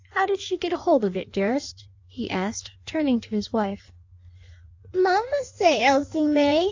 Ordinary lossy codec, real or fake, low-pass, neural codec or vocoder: AAC, 48 kbps; fake; 7.2 kHz; codec, 16 kHz in and 24 kHz out, 1.1 kbps, FireRedTTS-2 codec